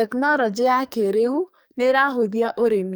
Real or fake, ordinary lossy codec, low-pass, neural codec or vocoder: fake; none; none; codec, 44.1 kHz, 2.6 kbps, SNAC